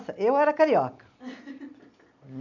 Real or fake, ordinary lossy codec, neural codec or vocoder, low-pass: real; none; none; 7.2 kHz